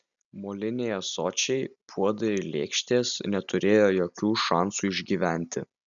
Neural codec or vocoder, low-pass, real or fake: none; 7.2 kHz; real